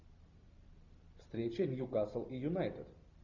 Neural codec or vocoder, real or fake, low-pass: none; real; 7.2 kHz